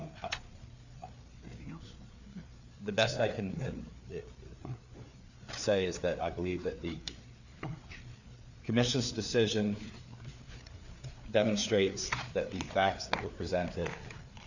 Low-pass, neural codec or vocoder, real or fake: 7.2 kHz; codec, 16 kHz, 4 kbps, FreqCodec, larger model; fake